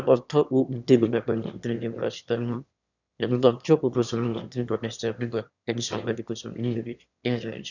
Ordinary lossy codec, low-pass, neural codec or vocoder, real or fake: none; 7.2 kHz; autoencoder, 22.05 kHz, a latent of 192 numbers a frame, VITS, trained on one speaker; fake